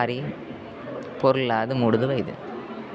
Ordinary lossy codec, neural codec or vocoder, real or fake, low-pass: none; none; real; none